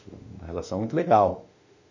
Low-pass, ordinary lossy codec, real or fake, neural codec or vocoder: 7.2 kHz; none; fake; autoencoder, 48 kHz, 32 numbers a frame, DAC-VAE, trained on Japanese speech